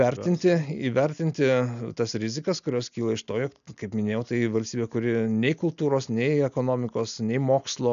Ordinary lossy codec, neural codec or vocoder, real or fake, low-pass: MP3, 96 kbps; none; real; 7.2 kHz